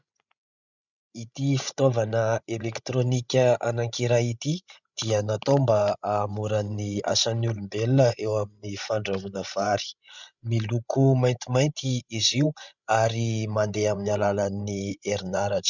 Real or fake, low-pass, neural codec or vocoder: fake; 7.2 kHz; codec, 16 kHz, 16 kbps, FreqCodec, larger model